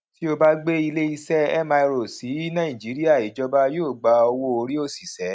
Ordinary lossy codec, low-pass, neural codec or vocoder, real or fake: none; none; none; real